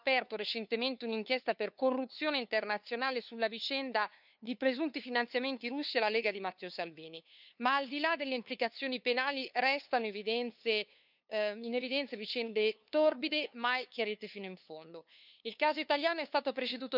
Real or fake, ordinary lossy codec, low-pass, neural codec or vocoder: fake; none; 5.4 kHz; codec, 16 kHz, 2 kbps, FunCodec, trained on LibriTTS, 25 frames a second